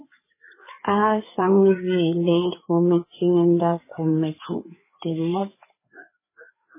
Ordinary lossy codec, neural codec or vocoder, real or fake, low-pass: MP3, 16 kbps; codec, 16 kHz, 4 kbps, FreqCodec, larger model; fake; 3.6 kHz